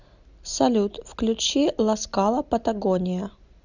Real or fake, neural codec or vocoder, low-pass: real; none; 7.2 kHz